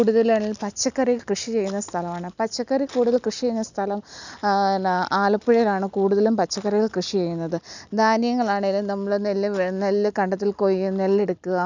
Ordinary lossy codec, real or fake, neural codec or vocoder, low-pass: none; real; none; 7.2 kHz